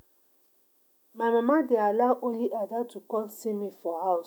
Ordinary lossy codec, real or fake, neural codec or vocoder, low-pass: none; fake; autoencoder, 48 kHz, 128 numbers a frame, DAC-VAE, trained on Japanese speech; none